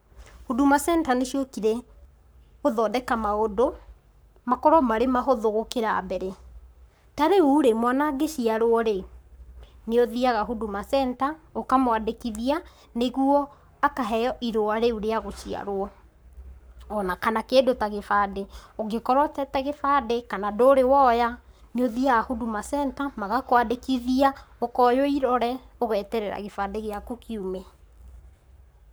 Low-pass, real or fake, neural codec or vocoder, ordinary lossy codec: none; fake; codec, 44.1 kHz, 7.8 kbps, Pupu-Codec; none